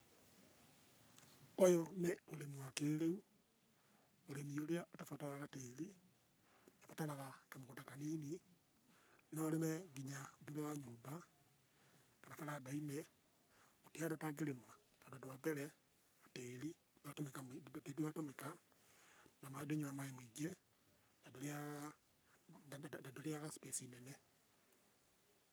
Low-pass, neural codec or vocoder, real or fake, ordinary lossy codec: none; codec, 44.1 kHz, 3.4 kbps, Pupu-Codec; fake; none